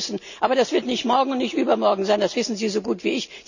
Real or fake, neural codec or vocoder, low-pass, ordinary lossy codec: real; none; 7.2 kHz; none